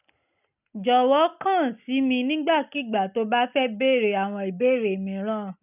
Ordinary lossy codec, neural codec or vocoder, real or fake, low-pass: none; none; real; 3.6 kHz